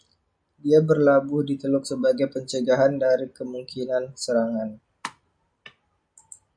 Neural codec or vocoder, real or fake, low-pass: none; real; 9.9 kHz